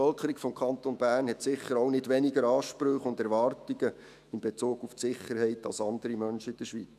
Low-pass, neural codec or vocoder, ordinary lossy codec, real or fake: 14.4 kHz; autoencoder, 48 kHz, 128 numbers a frame, DAC-VAE, trained on Japanese speech; none; fake